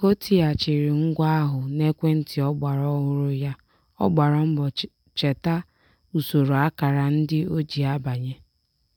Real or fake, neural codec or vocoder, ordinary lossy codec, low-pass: real; none; MP3, 96 kbps; 19.8 kHz